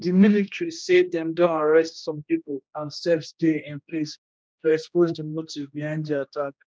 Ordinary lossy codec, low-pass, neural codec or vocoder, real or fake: none; none; codec, 16 kHz, 1 kbps, X-Codec, HuBERT features, trained on general audio; fake